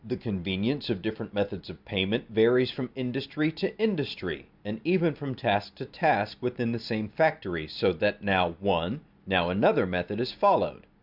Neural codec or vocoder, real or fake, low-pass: none; real; 5.4 kHz